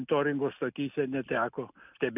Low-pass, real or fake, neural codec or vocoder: 3.6 kHz; real; none